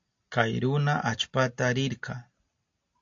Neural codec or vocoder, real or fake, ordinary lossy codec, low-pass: none; real; AAC, 64 kbps; 7.2 kHz